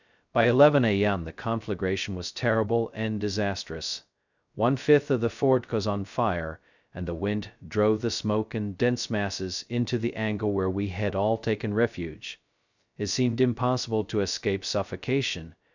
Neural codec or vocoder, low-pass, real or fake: codec, 16 kHz, 0.2 kbps, FocalCodec; 7.2 kHz; fake